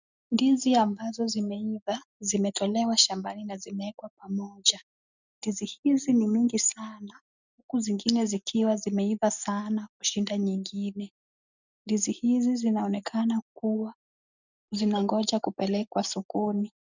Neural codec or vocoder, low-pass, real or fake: none; 7.2 kHz; real